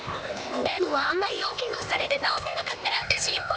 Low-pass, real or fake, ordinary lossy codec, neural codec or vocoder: none; fake; none; codec, 16 kHz, 0.8 kbps, ZipCodec